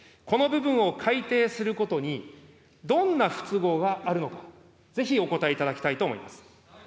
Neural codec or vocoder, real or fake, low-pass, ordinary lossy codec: none; real; none; none